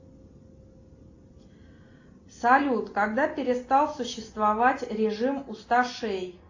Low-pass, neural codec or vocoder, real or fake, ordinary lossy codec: 7.2 kHz; none; real; AAC, 48 kbps